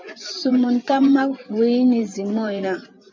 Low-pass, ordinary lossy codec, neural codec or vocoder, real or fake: 7.2 kHz; MP3, 64 kbps; vocoder, 24 kHz, 100 mel bands, Vocos; fake